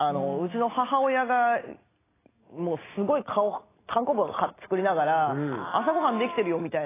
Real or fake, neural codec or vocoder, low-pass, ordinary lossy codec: real; none; 3.6 kHz; AAC, 16 kbps